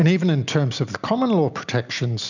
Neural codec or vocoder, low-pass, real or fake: none; 7.2 kHz; real